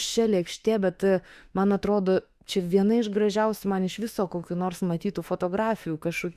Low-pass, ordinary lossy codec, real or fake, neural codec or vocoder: 14.4 kHz; Opus, 64 kbps; fake; autoencoder, 48 kHz, 32 numbers a frame, DAC-VAE, trained on Japanese speech